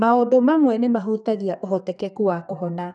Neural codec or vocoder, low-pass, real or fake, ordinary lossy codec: codec, 32 kHz, 1.9 kbps, SNAC; 10.8 kHz; fake; none